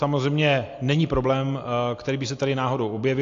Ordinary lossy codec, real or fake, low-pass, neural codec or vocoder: AAC, 48 kbps; real; 7.2 kHz; none